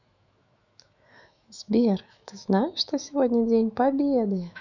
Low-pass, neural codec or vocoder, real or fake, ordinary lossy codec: 7.2 kHz; autoencoder, 48 kHz, 128 numbers a frame, DAC-VAE, trained on Japanese speech; fake; none